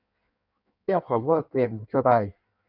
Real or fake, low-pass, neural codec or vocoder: fake; 5.4 kHz; codec, 16 kHz in and 24 kHz out, 1.1 kbps, FireRedTTS-2 codec